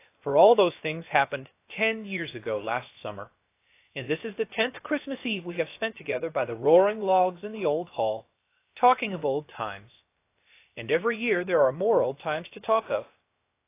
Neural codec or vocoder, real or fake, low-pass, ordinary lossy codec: codec, 16 kHz, about 1 kbps, DyCAST, with the encoder's durations; fake; 3.6 kHz; AAC, 24 kbps